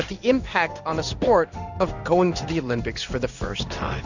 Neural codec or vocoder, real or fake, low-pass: codec, 16 kHz in and 24 kHz out, 1 kbps, XY-Tokenizer; fake; 7.2 kHz